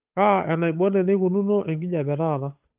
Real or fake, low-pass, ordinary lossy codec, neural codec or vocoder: fake; 3.6 kHz; Opus, 24 kbps; codec, 16 kHz, 8 kbps, FunCodec, trained on Chinese and English, 25 frames a second